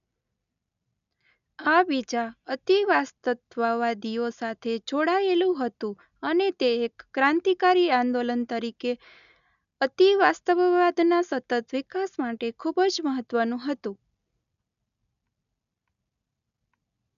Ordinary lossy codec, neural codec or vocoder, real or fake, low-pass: none; none; real; 7.2 kHz